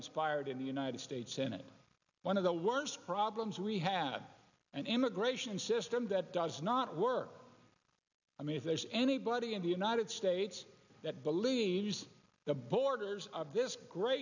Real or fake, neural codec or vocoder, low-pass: real; none; 7.2 kHz